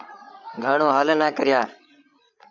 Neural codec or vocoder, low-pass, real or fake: codec, 16 kHz, 16 kbps, FreqCodec, larger model; 7.2 kHz; fake